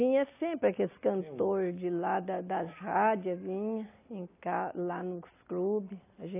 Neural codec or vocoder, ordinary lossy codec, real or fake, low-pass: none; AAC, 24 kbps; real; 3.6 kHz